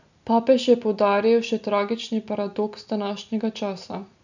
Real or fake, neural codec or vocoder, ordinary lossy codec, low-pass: real; none; none; 7.2 kHz